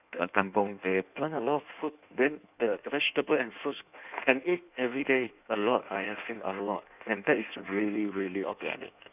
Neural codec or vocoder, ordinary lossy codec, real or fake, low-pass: codec, 16 kHz in and 24 kHz out, 1.1 kbps, FireRedTTS-2 codec; none; fake; 3.6 kHz